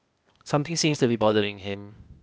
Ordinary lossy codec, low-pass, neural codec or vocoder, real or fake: none; none; codec, 16 kHz, 0.8 kbps, ZipCodec; fake